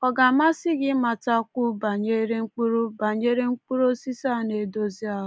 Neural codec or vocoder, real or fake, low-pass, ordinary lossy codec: none; real; none; none